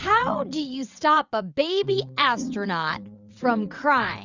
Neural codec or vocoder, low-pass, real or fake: vocoder, 22.05 kHz, 80 mel bands, WaveNeXt; 7.2 kHz; fake